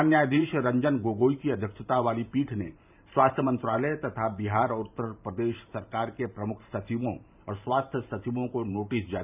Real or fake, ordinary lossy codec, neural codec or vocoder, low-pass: fake; none; vocoder, 44.1 kHz, 128 mel bands every 512 samples, BigVGAN v2; 3.6 kHz